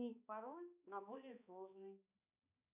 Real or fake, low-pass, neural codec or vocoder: fake; 3.6 kHz; codec, 16 kHz, 2 kbps, X-Codec, HuBERT features, trained on balanced general audio